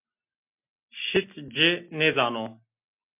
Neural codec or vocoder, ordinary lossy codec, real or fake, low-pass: none; MP3, 32 kbps; real; 3.6 kHz